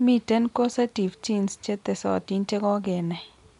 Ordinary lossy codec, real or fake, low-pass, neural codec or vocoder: MP3, 64 kbps; real; 10.8 kHz; none